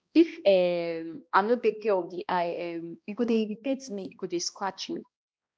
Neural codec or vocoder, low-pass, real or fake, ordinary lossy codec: codec, 16 kHz, 1 kbps, X-Codec, HuBERT features, trained on balanced general audio; none; fake; none